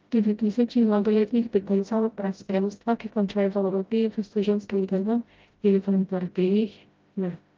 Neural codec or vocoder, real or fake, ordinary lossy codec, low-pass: codec, 16 kHz, 0.5 kbps, FreqCodec, smaller model; fake; Opus, 24 kbps; 7.2 kHz